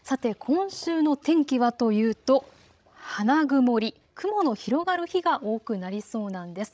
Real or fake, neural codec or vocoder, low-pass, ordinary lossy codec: fake; codec, 16 kHz, 16 kbps, FreqCodec, larger model; none; none